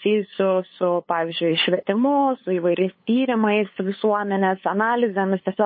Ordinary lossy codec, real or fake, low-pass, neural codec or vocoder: MP3, 24 kbps; fake; 7.2 kHz; codec, 16 kHz, 8 kbps, FunCodec, trained on LibriTTS, 25 frames a second